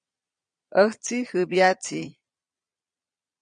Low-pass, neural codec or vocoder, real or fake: 9.9 kHz; vocoder, 22.05 kHz, 80 mel bands, Vocos; fake